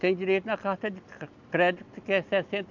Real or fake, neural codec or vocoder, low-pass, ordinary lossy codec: real; none; 7.2 kHz; none